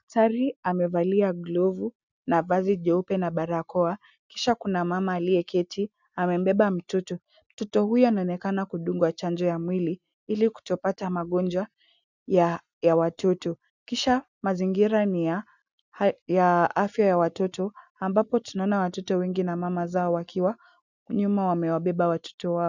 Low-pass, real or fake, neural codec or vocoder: 7.2 kHz; real; none